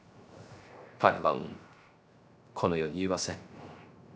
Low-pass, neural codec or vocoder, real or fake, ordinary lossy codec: none; codec, 16 kHz, 0.3 kbps, FocalCodec; fake; none